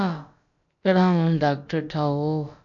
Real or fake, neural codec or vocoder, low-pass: fake; codec, 16 kHz, about 1 kbps, DyCAST, with the encoder's durations; 7.2 kHz